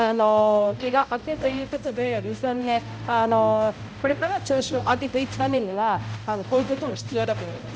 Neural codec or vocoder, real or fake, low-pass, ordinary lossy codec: codec, 16 kHz, 0.5 kbps, X-Codec, HuBERT features, trained on balanced general audio; fake; none; none